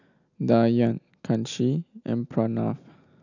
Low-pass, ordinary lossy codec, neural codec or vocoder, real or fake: 7.2 kHz; none; none; real